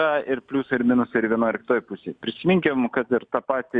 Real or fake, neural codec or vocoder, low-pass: real; none; 9.9 kHz